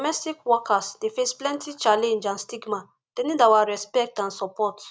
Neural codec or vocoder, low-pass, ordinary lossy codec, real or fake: none; none; none; real